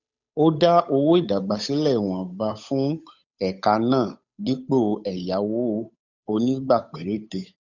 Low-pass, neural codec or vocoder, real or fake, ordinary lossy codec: 7.2 kHz; codec, 16 kHz, 8 kbps, FunCodec, trained on Chinese and English, 25 frames a second; fake; none